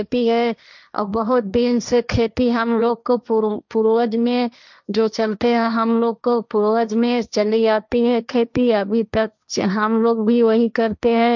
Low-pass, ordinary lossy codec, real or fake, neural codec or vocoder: 7.2 kHz; none; fake; codec, 16 kHz, 1.1 kbps, Voila-Tokenizer